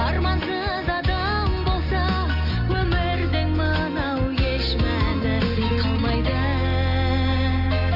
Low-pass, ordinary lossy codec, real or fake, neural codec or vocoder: 5.4 kHz; none; real; none